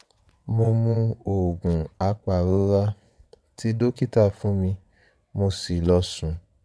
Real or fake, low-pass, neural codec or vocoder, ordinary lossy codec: fake; none; vocoder, 22.05 kHz, 80 mel bands, WaveNeXt; none